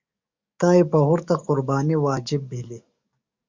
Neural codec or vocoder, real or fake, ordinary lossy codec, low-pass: codec, 44.1 kHz, 7.8 kbps, DAC; fake; Opus, 64 kbps; 7.2 kHz